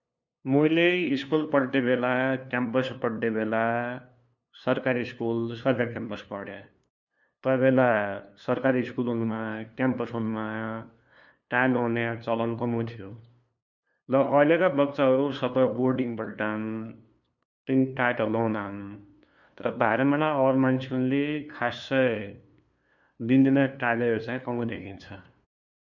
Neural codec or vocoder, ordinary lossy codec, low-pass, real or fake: codec, 16 kHz, 2 kbps, FunCodec, trained on LibriTTS, 25 frames a second; none; 7.2 kHz; fake